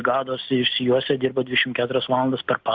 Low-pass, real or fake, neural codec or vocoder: 7.2 kHz; real; none